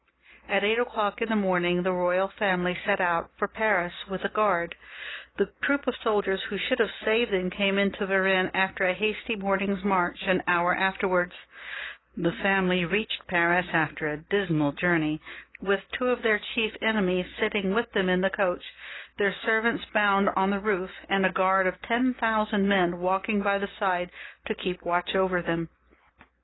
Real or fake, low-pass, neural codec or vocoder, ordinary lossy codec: real; 7.2 kHz; none; AAC, 16 kbps